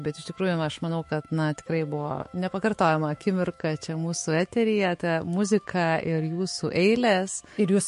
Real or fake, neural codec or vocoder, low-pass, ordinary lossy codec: fake; autoencoder, 48 kHz, 128 numbers a frame, DAC-VAE, trained on Japanese speech; 14.4 kHz; MP3, 48 kbps